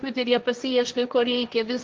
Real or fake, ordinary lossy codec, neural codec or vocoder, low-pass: fake; Opus, 32 kbps; codec, 16 kHz, 1.1 kbps, Voila-Tokenizer; 7.2 kHz